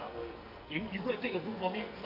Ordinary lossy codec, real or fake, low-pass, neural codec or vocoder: none; fake; 5.4 kHz; codec, 44.1 kHz, 2.6 kbps, SNAC